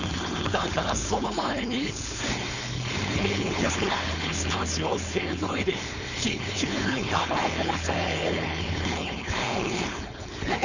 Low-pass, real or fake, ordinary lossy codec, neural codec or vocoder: 7.2 kHz; fake; none; codec, 16 kHz, 4.8 kbps, FACodec